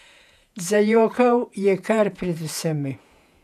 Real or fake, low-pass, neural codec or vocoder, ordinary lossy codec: fake; 14.4 kHz; vocoder, 48 kHz, 128 mel bands, Vocos; none